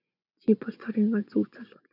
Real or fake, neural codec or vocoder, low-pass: fake; vocoder, 24 kHz, 100 mel bands, Vocos; 5.4 kHz